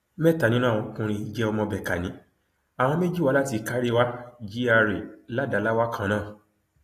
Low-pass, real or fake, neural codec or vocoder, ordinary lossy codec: 14.4 kHz; real; none; AAC, 48 kbps